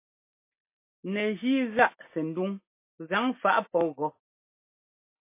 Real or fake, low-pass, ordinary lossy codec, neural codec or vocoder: real; 3.6 kHz; MP3, 24 kbps; none